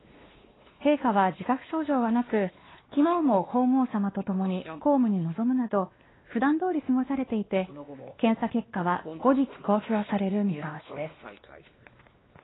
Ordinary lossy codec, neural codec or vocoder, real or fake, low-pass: AAC, 16 kbps; codec, 16 kHz, 2 kbps, X-Codec, WavLM features, trained on Multilingual LibriSpeech; fake; 7.2 kHz